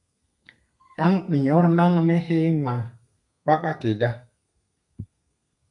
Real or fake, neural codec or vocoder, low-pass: fake; codec, 32 kHz, 1.9 kbps, SNAC; 10.8 kHz